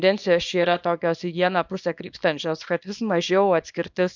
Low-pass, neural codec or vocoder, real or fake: 7.2 kHz; codec, 24 kHz, 0.9 kbps, WavTokenizer, small release; fake